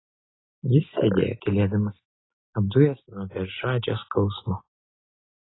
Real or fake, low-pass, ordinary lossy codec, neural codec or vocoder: real; 7.2 kHz; AAC, 16 kbps; none